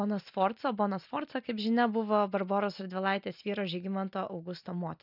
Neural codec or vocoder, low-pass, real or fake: none; 5.4 kHz; real